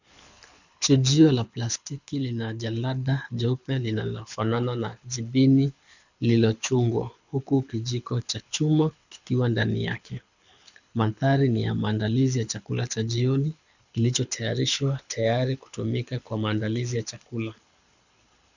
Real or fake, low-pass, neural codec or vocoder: fake; 7.2 kHz; codec, 24 kHz, 6 kbps, HILCodec